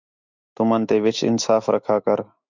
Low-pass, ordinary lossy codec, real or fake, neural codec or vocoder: 7.2 kHz; Opus, 64 kbps; fake; autoencoder, 48 kHz, 128 numbers a frame, DAC-VAE, trained on Japanese speech